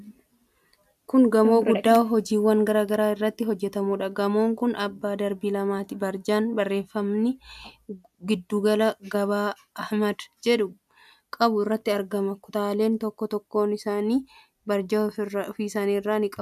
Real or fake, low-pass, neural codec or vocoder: real; 14.4 kHz; none